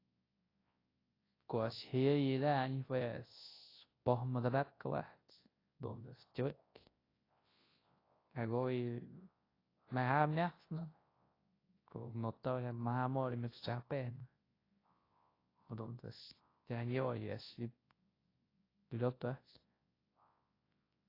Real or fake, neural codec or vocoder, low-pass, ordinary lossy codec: fake; codec, 24 kHz, 0.9 kbps, WavTokenizer, large speech release; 5.4 kHz; AAC, 24 kbps